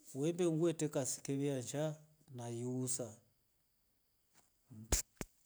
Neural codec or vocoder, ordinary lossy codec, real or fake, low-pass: none; none; real; none